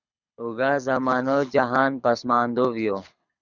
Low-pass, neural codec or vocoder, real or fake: 7.2 kHz; codec, 24 kHz, 6 kbps, HILCodec; fake